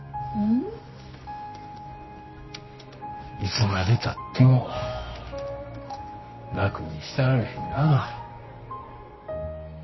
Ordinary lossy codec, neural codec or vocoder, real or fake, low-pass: MP3, 24 kbps; codec, 24 kHz, 0.9 kbps, WavTokenizer, medium music audio release; fake; 7.2 kHz